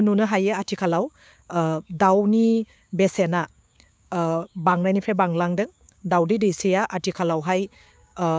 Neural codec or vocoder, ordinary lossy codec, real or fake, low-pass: codec, 16 kHz, 6 kbps, DAC; none; fake; none